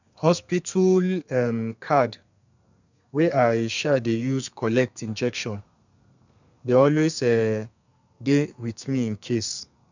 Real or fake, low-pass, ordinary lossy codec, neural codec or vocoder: fake; 7.2 kHz; none; codec, 32 kHz, 1.9 kbps, SNAC